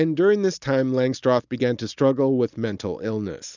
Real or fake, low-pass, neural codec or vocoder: real; 7.2 kHz; none